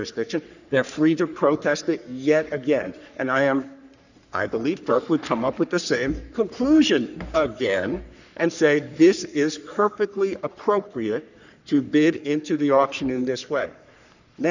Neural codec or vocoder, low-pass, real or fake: codec, 44.1 kHz, 3.4 kbps, Pupu-Codec; 7.2 kHz; fake